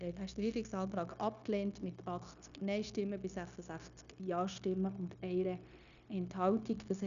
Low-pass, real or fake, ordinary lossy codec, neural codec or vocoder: 7.2 kHz; fake; none; codec, 16 kHz, 0.9 kbps, LongCat-Audio-Codec